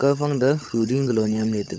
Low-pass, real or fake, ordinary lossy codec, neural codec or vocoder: none; fake; none; codec, 16 kHz, 16 kbps, FunCodec, trained on LibriTTS, 50 frames a second